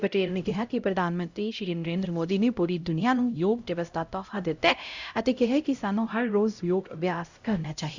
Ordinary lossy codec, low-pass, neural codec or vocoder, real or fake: Opus, 64 kbps; 7.2 kHz; codec, 16 kHz, 0.5 kbps, X-Codec, HuBERT features, trained on LibriSpeech; fake